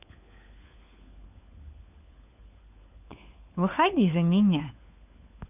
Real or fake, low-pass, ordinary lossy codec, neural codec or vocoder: fake; 3.6 kHz; none; codec, 16 kHz, 4 kbps, FunCodec, trained on LibriTTS, 50 frames a second